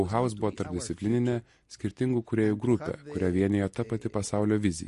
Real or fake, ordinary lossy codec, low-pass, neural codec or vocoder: real; MP3, 48 kbps; 14.4 kHz; none